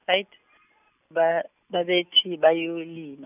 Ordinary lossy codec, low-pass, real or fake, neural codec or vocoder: none; 3.6 kHz; real; none